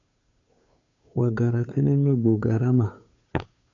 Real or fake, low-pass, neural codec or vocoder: fake; 7.2 kHz; codec, 16 kHz, 2 kbps, FunCodec, trained on Chinese and English, 25 frames a second